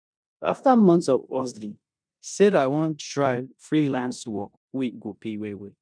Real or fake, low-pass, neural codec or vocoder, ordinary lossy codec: fake; 9.9 kHz; codec, 16 kHz in and 24 kHz out, 0.9 kbps, LongCat-Audio-Codec, four codebook decoder; none